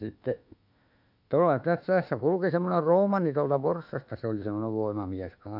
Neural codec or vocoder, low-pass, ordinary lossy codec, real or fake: autoencoder, 48 kHz, 32 numbers a frame, DAC-VAE, trained on Japanese speech; 5.4 kHz; none; fake